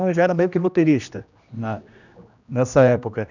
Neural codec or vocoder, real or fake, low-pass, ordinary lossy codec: codec, 16 kHz, 1 kbps, X-Codec, HuBERT features, trained on general audio; fake; 7.2 kHz; none